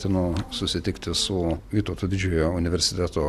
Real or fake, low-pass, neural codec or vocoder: real; 14.4 kHz; none